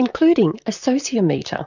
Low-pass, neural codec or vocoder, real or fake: 7.2 kHz; vocoder, 44.1 kHz, 128 mel bands, Pupu-Vocoder; fake